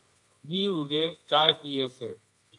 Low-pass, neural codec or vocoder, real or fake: 10.8 kHz; codec, 24 kHz, 0.9 kbps, WavTokenizer, medium music audio release; fake